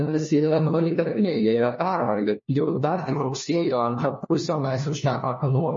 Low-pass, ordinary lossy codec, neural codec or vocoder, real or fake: 7.2 kHz; MP3, 32 kbps; codec, 16 kHz, 1 kbps, FunCodec, trained on LibriTTS, 50 frames a second; fake